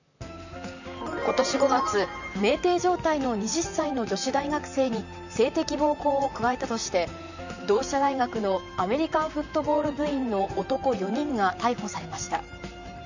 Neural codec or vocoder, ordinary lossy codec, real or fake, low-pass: vocoder, 44.1 kHz, 128 mel bands, Pupu-Vocoder; none; fake; 7.2 kHz